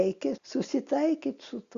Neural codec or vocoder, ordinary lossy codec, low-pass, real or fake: none; Opus, 64 kbps; 7.2 kHz; real